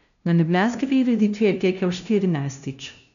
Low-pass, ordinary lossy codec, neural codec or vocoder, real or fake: 7.2 kHz; none; codec, 16 kHz, 0.5 kbps, FunCodec, trained on LibriTTS, 25 frames a second; fake